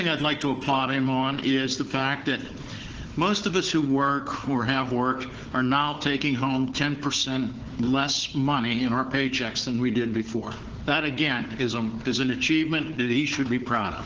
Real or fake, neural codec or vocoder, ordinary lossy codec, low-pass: fake; codec, 16 kHz, 4 kbps, FunCodec, trained on Chinese and English, 50 frames a second; Opus, 16 kbps; 7.2 kHz